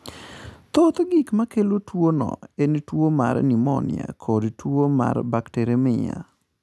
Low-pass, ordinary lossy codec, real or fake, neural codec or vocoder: none; none; real; none